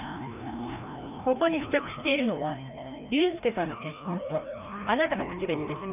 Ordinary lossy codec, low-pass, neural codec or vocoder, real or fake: none; 3.6 kHz; codec, 16 kHz, 1 kbps, FreqCodec, larger model; fake